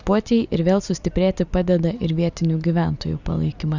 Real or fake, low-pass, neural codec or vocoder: real; 7.2 kHz; none